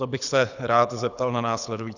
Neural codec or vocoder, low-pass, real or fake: codec, 24 kHz, 6 kbps, HILCodec; 7.2 kHz; fake